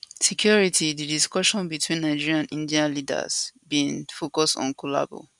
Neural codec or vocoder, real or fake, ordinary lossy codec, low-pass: none; real; none; 10.8 kHz